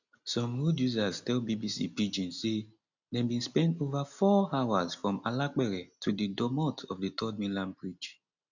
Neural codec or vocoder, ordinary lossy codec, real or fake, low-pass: none; none; real; 7.2 kHz